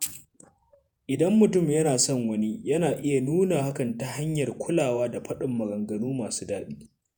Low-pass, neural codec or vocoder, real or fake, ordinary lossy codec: none; vocoder, 48 kHz, 128 mel bands, Vocos; fake; none